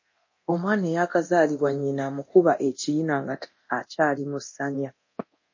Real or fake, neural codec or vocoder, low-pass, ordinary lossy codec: fake; codec, 24 kHz, 0.9 kbps, DualCodec; 7.2 kHz; MP3, 32 kbps